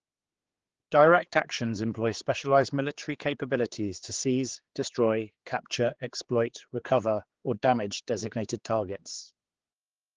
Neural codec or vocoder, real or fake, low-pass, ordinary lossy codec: codec, 16 kHz, 4 kbps, X-Codec, HuBERT features, trained on general audio; fake; 7.2 kHz; Opus, 16 kbps